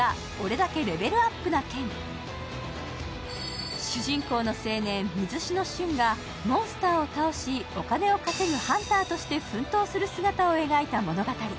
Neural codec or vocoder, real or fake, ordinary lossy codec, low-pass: none; real; none; none